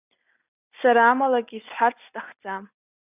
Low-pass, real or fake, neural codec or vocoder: 3.6 kHz; real; none